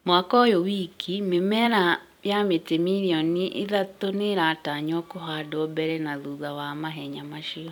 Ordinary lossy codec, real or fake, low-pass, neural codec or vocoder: none; fake; 19.8 kHz; vocoder, 44.1 kHz, 128 mel bands every 256 samples, BigVGAN v2